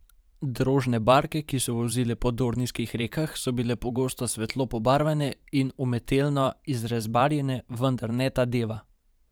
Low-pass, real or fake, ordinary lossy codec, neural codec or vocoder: none; fake; none; vocoder, 44.1 kHz, 128 mel bands every 512 samples, BigVGAN v2